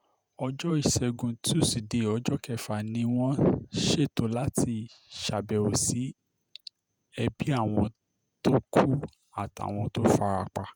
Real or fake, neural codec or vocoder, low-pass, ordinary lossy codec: fake; vocoder, 48 kHz, 128 mel bands, Vocos; none; none